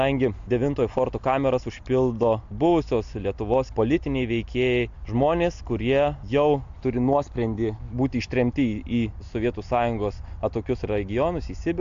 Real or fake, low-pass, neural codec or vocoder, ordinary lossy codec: real; 7.2 kHz; none; AAC, 64 kbps